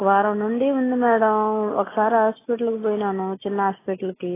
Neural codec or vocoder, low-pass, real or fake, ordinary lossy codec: none; 3.6 kHz; real; AAC, 16 kbps